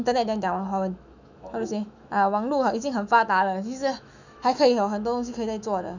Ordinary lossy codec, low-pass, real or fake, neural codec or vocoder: none; 7.2 kHz; fake; autoencoder, 48 kHz, 128 numbers a frame, DAC-VAE, trained on Japanese speech